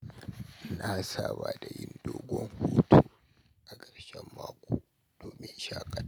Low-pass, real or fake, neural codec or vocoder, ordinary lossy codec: none; real; none; none